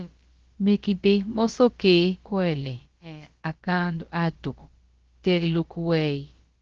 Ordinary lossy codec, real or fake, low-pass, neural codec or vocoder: Opus, 16 kbps; fake; 7.2 kHz; codec, 16 kHz, about 1 kbps, DyCAST, with the encoder's durations